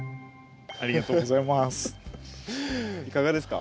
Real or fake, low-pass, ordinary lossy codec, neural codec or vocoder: real; none; none; none